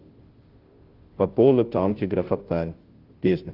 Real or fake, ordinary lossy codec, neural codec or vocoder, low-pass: fake; Opus, 16 kbps; codec, 16 kHz, 0.5 kbps, FunCodec, trained on Chinese and English, 25 frames a second; 5.4 kHz